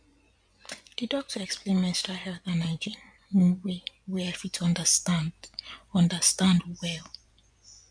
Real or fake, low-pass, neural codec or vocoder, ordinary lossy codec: fake; 9.9 kHz; vocoder, 24 kHz, 100 mel bands, Vocos; MP3, 64 kbps